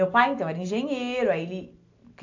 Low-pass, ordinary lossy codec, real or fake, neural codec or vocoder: 7.2 kHz; AAC, 48 kbps; real; none